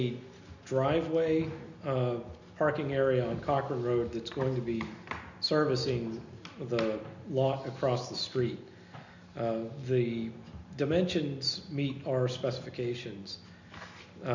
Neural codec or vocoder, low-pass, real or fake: none; 7.2 kHz; real